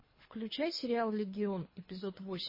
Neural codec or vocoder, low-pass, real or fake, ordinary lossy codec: codec, 24 kHz, 3 kbps, HILCodec; 5.4 kHz; fake; MP3, 24 kbps